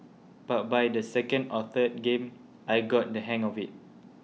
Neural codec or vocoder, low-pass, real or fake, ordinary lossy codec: none; none; real; none